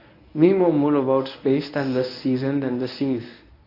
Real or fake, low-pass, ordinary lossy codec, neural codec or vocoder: fake; 5.4 kHz; AAC, 32 kbps; codec, 24 kHz, 0.9 kbps, WavTokenizer, medium speech release version 1